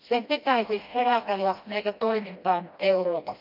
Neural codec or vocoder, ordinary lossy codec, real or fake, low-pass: codec, 16 kHz, 1 kbps, FreqCodec, smaller model; none; fake; 5.4 kHz